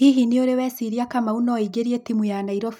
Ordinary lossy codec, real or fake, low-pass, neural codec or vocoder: none; real; 19.8 kHz; none